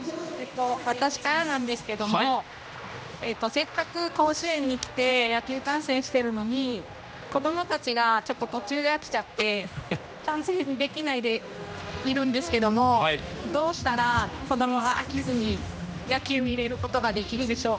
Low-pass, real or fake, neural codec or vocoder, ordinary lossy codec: none; fake; codec, 16 kHz, 1 kbps, X-Codec, HuBERT features, trained on general audio; none